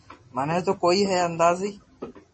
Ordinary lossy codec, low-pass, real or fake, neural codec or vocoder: MP3, 32 kbps; 10.8 kHz; real; none